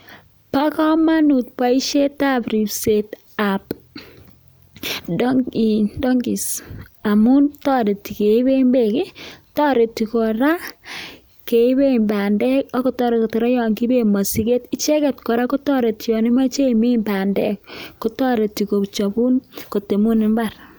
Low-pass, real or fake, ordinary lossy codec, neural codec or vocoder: none; real; none; none